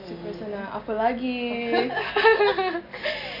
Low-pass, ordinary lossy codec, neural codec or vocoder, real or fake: 5.4 kHz; none; none; real